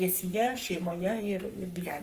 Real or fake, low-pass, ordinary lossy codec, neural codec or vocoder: fake; 14.4 kHz; Opus, 32 kbps; codec, 44.1 kHz, 3.4 kbps, Pupu-Codec